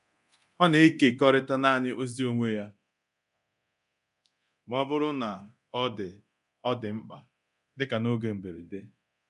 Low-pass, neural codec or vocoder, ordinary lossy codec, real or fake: 10.8 kHz; codec, 24 kHz, 0.9 kbps, DualCodec; none; fake